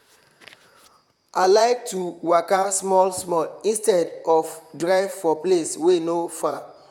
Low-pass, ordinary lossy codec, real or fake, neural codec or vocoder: 19.8 kHz; none; fake; vocoder, 44.1 kHz, 128 mel bands, Pupu-Vocoder